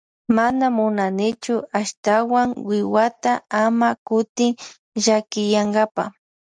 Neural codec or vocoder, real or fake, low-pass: none; real; 9.9 kHz